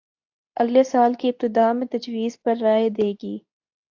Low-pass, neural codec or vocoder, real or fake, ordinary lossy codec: 7.2 kHz; codec, 24 kHz, 0.9 kbps, WavTokenizer, medium speech release version 2; fake; AAC, 48 kbps